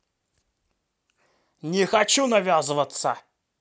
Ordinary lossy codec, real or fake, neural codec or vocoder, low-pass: none; real; none; none